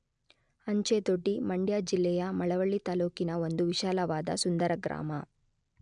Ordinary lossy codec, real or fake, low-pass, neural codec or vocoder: none; real; 9.9 kHz; none